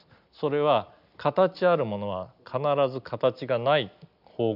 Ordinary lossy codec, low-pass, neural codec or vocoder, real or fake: none; 5.4 kHz; none; real